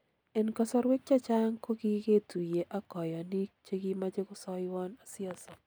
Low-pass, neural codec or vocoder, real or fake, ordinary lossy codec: none; none; real; none